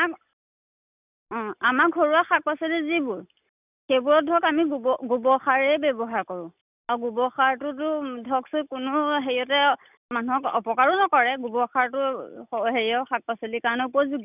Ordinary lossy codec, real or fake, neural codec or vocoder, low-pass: none; real; none; 3.6 kHz